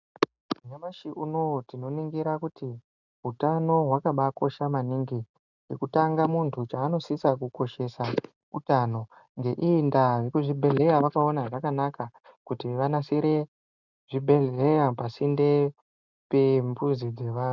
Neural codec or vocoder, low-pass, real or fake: none; 7.2 kHz; real